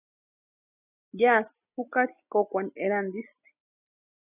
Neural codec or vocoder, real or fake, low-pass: none; real; 3.6 kHz